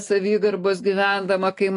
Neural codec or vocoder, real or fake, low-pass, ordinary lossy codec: none; real; 10.8 kHz; AAC, 48 kbps